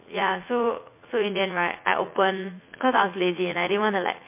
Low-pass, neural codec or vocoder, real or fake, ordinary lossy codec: 3.6 kHz; vocoder, 44.1 kHz, 80 mel bands, Vocos; fake; MP3, 24 kbps